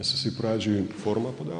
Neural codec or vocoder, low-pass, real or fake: none; 9.9 kHz; real